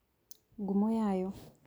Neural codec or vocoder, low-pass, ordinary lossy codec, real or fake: none; none; none; real